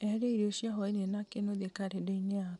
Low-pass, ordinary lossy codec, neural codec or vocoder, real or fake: 10.8 kHz; none; none; real